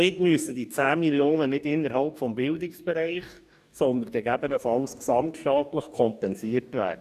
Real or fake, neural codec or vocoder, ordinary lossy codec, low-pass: fake; codec, 44.1 kHz, 2.6 kbps, DAC; none; 14.4 kHz